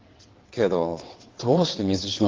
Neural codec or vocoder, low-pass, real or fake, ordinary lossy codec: vocoder, 22.05 kHz, 80 mel bands, WaveNeXt; 7.2 kHz; fake; Opus, 16 kbps